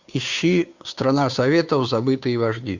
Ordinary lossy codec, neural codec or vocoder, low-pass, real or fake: Opus, 64 kbps; codec, 16 kHz, 2 kbps, FunCodec, trained on Chinese and English, 25 frames a second; 7.2 kHz; fake